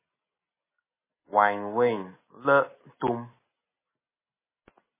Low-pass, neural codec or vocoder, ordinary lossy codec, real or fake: 3.6 kHz; none; MP3, 16 kbps; real